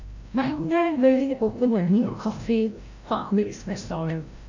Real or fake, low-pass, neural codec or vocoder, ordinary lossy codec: fake; 7.2 kHz; codec, 16 kHz, 0.5 kbps, FreqCodec, larger model; AAC, 48 kbps